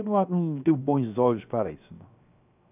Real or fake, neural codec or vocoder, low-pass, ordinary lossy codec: fake; codec, 16 kHz, 0.7 kbps, FocalCodec; 3.6 kHz; none